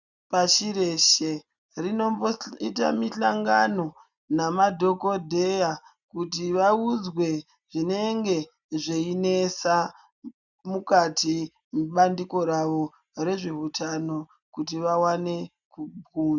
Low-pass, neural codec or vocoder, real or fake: 7.2 kHz; none; real